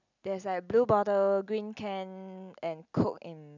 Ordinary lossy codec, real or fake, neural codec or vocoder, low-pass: none; real; none; 7.2 kHz